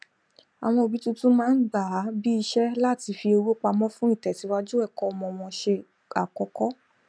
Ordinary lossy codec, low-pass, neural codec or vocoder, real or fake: none; none; none; real